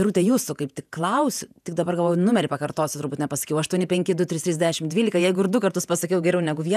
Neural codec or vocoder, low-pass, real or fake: vocoder, 48 kHz, 128 mel bands, Vocos; 14.4 kHz; fake